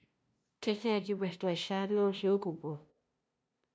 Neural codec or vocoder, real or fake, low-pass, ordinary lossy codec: codec, 16 kHz, 0.5 kbps, FunCodec, trained on LibriTTS, 25 frames a second; fake; none; none